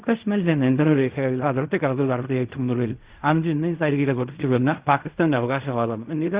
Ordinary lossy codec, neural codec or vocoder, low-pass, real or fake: Opus, 64 kbps; codec, 16 kHz in and 24 kHz out, 0.4 kbps, LongCat-Audio-Codec, fine tuned four codebook decoder; 3.6 kHz; fake